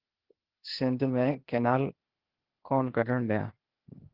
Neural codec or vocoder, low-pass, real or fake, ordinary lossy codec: codec, 16 kHz, 0.8 kbps, ZipCodec; 5.4 kHz; fake; Opus, 16 kbps